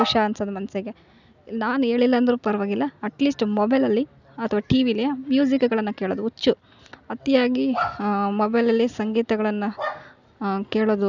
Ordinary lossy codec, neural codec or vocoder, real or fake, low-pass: none; none; real; 7.2 kHz